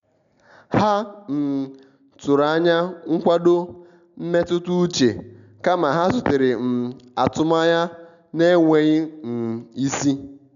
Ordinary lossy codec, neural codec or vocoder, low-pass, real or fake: none; none; 7.2 kHz; real